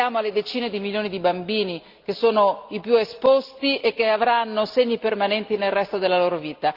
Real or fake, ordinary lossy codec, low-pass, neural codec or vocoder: real; Opus, 24 kbps; 5.4 kHz; none